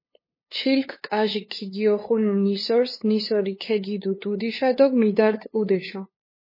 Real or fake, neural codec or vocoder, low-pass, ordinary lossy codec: fake; codec, 16 kHz, 2 kbps, FunCodec, trained on LibriTTS, 25 frames a second; 5.4 kHz; MP3, 24 kbps